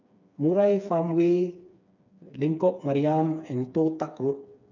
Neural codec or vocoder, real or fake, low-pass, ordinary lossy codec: codec, 16 kHz, 4 kbps, FreqCodec, smaller model; fake; 7.2 kHz; none